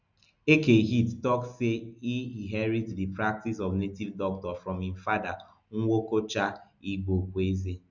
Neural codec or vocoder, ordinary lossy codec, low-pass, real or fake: none; none; 7.2 kHz; real